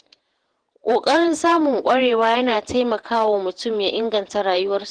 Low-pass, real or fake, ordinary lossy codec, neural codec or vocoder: 9.9 kHz; fake; Opus, 16 kbps; vocoder, 48 kHz, 128 mel bands, Vocos